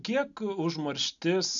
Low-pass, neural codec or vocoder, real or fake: 7.2 kHz; none; real